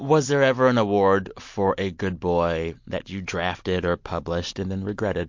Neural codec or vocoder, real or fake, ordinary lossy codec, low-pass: none; real; MP3, 48 kbps; 7.2 kHz